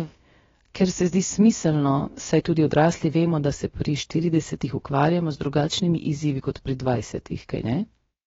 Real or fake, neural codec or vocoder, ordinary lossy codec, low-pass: fake; codec, 16 kHz, about 1 kbps, DyCAST, with the encoder's durations; AAC, 24 kbps; 7.2 kHz